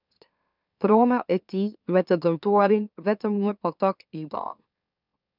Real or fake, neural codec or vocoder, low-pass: fake; autoencoder, 44.1 kHz, a latent of 192 numbers a frame, MeloTTS; 5.4 kHz